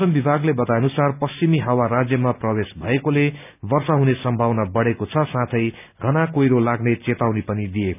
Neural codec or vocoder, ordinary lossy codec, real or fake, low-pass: none; none; real; 3.6 kHz